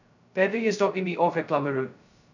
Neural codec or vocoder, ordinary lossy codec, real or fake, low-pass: codec, 16 kHz, 0.2 kbps, FocalCodec; none; fake; 7.2 kHz